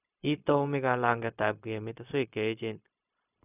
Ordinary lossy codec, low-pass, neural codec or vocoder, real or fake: none; 3.6 kHz; codec, 16 kHz, 0.4 kbps, LongCat-Audio-Codec; fake